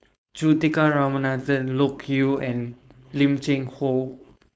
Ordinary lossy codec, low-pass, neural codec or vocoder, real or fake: none; none; codec, 16 kHz, 4.8 kbps, FACodec; fake